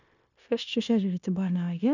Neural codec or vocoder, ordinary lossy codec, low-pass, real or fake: codec, 16 kHz, 0.9 kbps, LongCat-Audio-Codec; none; 7.2 kHz; fake